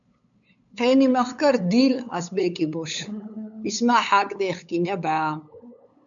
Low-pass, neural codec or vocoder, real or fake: 7.2 kHz; codec, 16 kHz, 8 kbps, FunCodec, trained on LibriTTS, 25 frames a second; fake